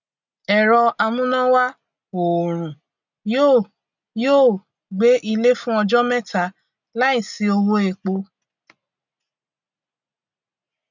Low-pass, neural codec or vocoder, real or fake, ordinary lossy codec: 7.2 kHz; none; real; none